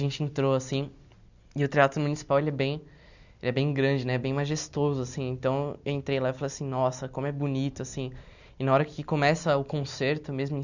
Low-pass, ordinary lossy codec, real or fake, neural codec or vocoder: 7.2 kHz; none; real; none